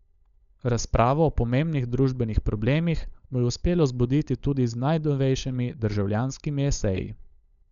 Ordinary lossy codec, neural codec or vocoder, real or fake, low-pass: none; codec, 16 kHz, 16 kbps, FunCodec, trained on LibriTTS, 50 frames a second; fake; 7.2 kHz